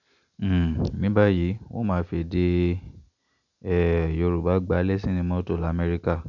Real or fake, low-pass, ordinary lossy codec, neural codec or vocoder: real; 7.2 kHz; none; none